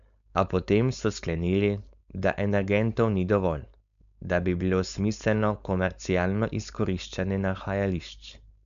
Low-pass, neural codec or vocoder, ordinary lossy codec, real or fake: 7.2 kHz; codec, 16 kHz, 4.8 kbps, FACodec; none; fake